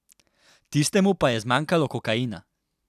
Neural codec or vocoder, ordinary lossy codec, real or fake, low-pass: none; none; real; 14.4 kHz